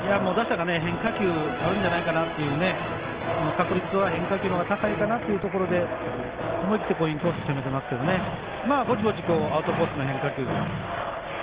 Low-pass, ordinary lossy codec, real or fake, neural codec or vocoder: 3.6 kHz; Opus, 16 kbps; real; none